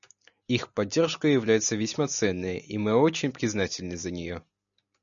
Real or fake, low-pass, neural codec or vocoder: real; 7.2 kHz; none